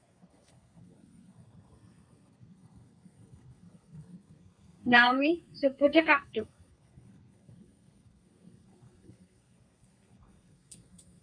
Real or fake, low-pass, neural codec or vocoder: fake; 9.9 kHz; codec, 32 kHz, 1.9 kbps, SNAC